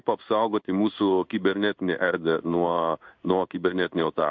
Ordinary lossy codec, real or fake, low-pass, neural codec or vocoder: MP3, 48 kbps; fake; 7.2 kHz; codec, 16 kHz in and 24 kHz out, 1 kbps, XY-Tokenizer